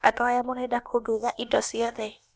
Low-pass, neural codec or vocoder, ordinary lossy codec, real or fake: none; codec, 16 kHz, about 1 kbps, DyCAST, with the encoder's durations; none; fake